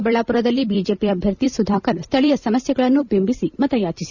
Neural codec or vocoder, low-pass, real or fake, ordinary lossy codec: vocoder, 44.1 kHz, 128 mel bands every 256 samples, BigVGAN v2; 7.2 kHz; fake; none